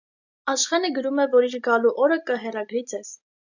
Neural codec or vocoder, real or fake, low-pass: none; real; 7.2 kHz